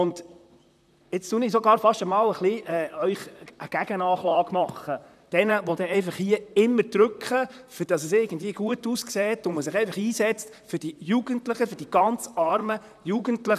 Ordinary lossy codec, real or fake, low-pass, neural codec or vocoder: none; fake; 14.4 kHz; vocoder, 44.1 kHz, 128 mel bands, Pupu-Vocoder